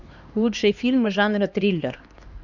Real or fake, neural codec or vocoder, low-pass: fake; codec, 16 kHz, 2 kbps, X-Codec, HuBERT features, trained on LibriSpeech; 7.2 kHz